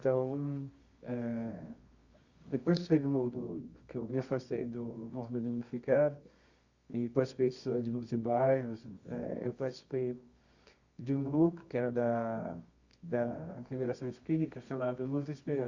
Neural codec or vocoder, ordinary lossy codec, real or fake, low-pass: codec, 24 kHz, 0.9 kbps, WavTokenizer, medium music audio release; Opus, 64 kbps; fake; 7.2 kHz